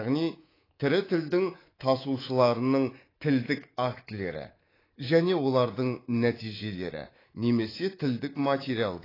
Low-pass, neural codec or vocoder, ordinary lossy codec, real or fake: 5.4 kHz; codec, 24 kHz, 3.1 kbps, DualCodec; AAC, 24 kbps; fake